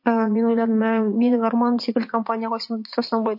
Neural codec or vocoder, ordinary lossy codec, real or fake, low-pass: vocoder, 44.1 kHz, 80 mel bands, Vocos; MP3, 32 kbps; fake; 5.4 kHz